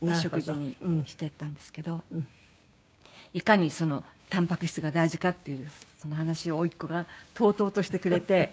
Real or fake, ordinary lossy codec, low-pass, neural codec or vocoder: fake; none; none; codec, 16 kHz, 6 kbps, DAC